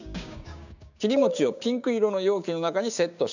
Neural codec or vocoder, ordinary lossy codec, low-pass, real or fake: codec, 16 kHz, 6 kbps, DAC; none; 7.2 kHz; fake